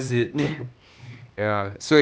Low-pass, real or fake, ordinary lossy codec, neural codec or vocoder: none; fake; none; codec, 16 kHz, 2 kbps, X-Codec, HuBERT features, trained on LibriSpeech